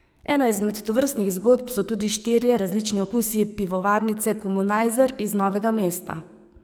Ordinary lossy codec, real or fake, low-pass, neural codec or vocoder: none; fake; none; codec, 44.1 kHz, 2.6 kbps, SNAC